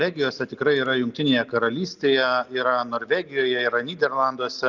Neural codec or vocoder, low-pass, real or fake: none; 7.2 kHz; real